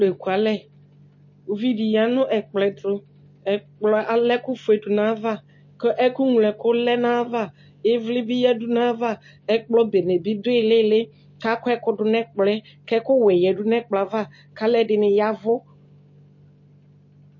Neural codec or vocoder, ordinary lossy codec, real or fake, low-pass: none; MP3, 32 kbps; real; 7.2 kHz